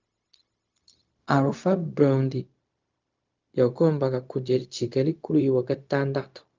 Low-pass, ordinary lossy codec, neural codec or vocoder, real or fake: 7.2 kHz; Opus, 24 kbps; codec, 16 kHz, 0.4 kbps, LongCat-Audio-Codec; fake